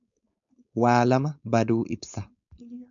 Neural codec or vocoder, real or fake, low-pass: codec, 16 kHz, 4.8 kbps, FACodec; fake; 7.2 kHz